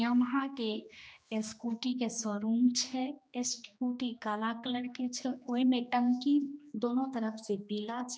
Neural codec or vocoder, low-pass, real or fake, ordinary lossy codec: codec, 16 kHz, 2 kbps, X-Codec, HuBERT features, trained on general audio; none; fake; none